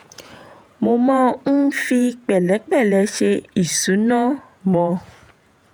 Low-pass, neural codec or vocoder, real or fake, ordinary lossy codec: none; vocoder, 48 kHz, 128 mel bands, Vocos; fake; none